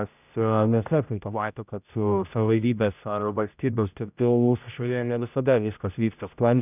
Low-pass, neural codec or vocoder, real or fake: 3.6 kHz; codec, 16 kHz, 0.5 kbps, X-Codec, HuBERT features, trained on general audio; fake